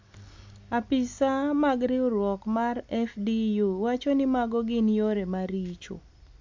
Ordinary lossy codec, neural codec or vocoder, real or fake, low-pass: MP3, 64 kbps; none; real; 7.2 kHz